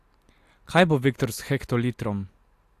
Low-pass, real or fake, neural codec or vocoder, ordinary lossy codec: 14.4 kHz; real; none; AAC, 64 kbps